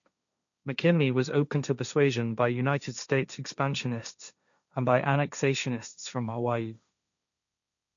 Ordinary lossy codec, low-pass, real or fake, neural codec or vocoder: none; 7.2 kHz; fake; codec, 16 kHz, 1.1 kbps, Voila-Tokenizer